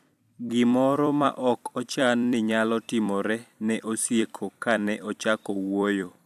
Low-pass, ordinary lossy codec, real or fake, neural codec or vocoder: 14.4 kHz; AAC, 96 kbps; fake; vocoder, 44.1 kHz, 128 mel bands every 256 samples, BigVGAN v2